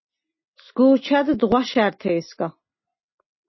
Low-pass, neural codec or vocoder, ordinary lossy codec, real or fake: 7.2 kHz; none; MP3, 24 kbps; real